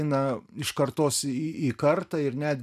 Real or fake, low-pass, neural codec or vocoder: real; 14.4 kHz; none